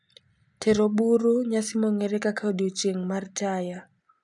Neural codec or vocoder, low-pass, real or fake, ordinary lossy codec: none; 10.8 kHz; real; none